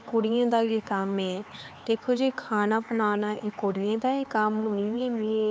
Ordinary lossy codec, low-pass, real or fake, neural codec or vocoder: none; none; fake; codec, 16 kHz, 4 kbps, X-Codec, HuBERT features, trained on LibriSpeech